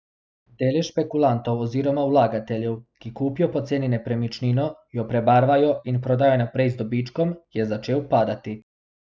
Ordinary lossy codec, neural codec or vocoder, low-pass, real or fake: none; none; none; real